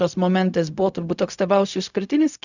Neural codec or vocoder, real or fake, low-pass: codec, 16 kHz, 0.4 kbps, LongCat-Audio-Codec; fake; 7.2 kHz